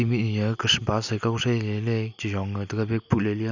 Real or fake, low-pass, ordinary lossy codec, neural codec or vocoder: real; 7.2 kHz; none; none